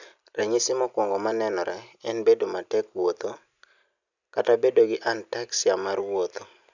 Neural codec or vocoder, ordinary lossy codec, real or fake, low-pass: none; none; real; 7.2 kHz